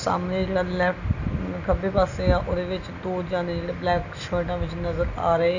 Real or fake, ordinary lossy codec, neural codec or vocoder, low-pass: real; none; none; 7.2 kHz